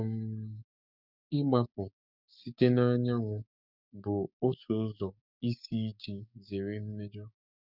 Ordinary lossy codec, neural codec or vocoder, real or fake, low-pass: none; codec, 16 kHz, 6 kbps, DAC; fake; 5.4 kHz